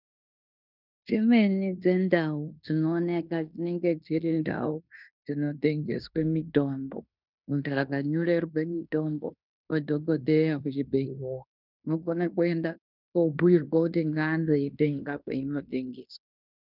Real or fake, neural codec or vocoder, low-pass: fake; codec, 16 kHz in and 24 kHz out, 0.9 kbps, LongCat-Audio-Codec, fine tuned four codebook decoder; 5.4 kHz